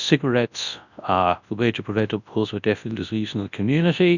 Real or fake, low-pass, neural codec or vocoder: fake; 7.2 kHz; codec, 24 kHz, 0.9 kbps, WavTokenizer, large speech release